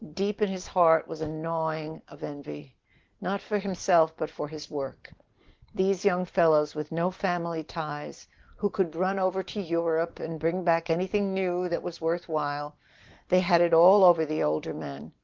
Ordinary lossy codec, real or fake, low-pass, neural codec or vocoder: Opus, 16 kbps; real; 7.2 kHz; none